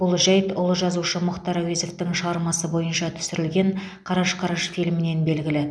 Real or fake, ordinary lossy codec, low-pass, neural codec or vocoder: real; none; none; none